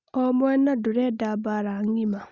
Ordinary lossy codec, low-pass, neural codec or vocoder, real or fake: Opus, 64 kbps; 7.2 kHz; none; real